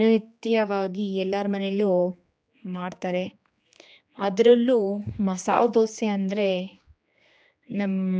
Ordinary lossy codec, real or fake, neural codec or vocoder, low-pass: none; fake; codec, 16 kHz, 2 kbps, X-Codec, HuBERT features, trained on general audio; none